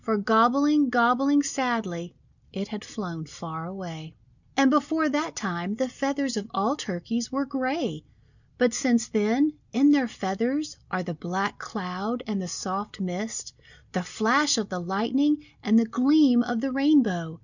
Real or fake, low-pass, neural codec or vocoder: real; 7.2 kHz; none